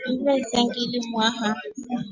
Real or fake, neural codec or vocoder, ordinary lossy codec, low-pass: real; none; Opus, 64 kbps; 7.2 kHz